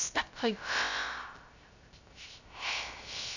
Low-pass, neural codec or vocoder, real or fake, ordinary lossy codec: 7.2 kHz; codec, 16 kHz, 0.3 kbps, FocalCodec; fake; none